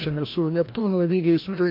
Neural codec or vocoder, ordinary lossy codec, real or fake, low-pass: codec, 16 kHz, 1 kbps, FreqCodec, larger model; MP3, 32 kbps; fake; 5.4 kHz